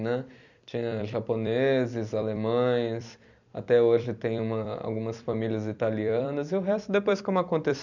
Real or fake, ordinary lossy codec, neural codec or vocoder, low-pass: fake; none; vocoder, 44.1 kHz, 128 mel bands every 256 samples, BigVGAN v2; 7.2 kHz